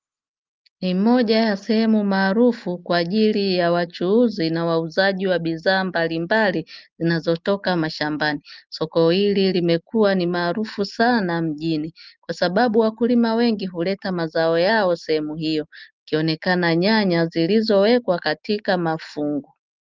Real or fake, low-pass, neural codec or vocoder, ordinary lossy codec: real; 7.2 kHz; none; Opus, 32 kbps